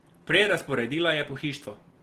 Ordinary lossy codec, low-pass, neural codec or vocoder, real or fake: Opus, 16 kbps; 14.4 kHz; none; real